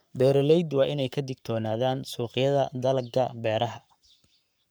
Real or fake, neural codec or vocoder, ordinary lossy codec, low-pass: fake; codec, 44.1 kHz, 7.8 kbps, Pupu-Codec; none; none